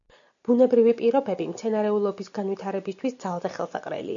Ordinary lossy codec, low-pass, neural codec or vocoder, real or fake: MP3, 96 kbps; 7.2 kHz; none; real